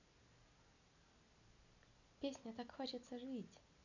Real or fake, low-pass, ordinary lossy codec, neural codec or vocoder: real; 7.2 kHz; none; none